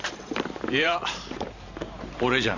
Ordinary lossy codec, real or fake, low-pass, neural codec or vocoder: none; fake; 7.2 kHz; vocoder, 44.1 kHz, 128 mel bands every 512 samples, BigVGAN v2